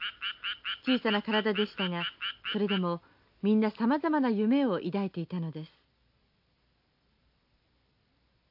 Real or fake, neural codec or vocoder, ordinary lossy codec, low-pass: real; none; AAC, 48 kbps; 5.4 kHz